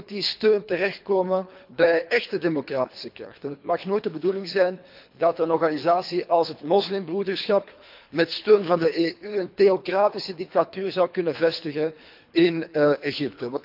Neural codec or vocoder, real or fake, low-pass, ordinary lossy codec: codec, 24 kHz, 3 kbps, HILCodec; fake; 5.4 kHz; MP3, 48 kbps